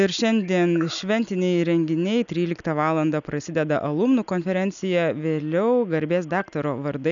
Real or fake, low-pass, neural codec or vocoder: real; 7.2 kHz; none